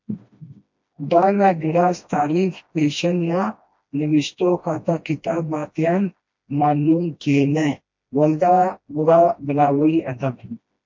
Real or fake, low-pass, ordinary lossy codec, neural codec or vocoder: fake; 7.2 kHz; MP3, 48 kbps; codec, 16 kHz, 1 kbps, FreqCodec, smaller model